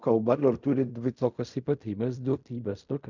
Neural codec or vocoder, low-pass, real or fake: codec, 16 kHz in and 24 kHz out, 0.4 kbps, LongCat-Audio-Codec, fine tuned four codebook decoder; 7.2 kHz; fake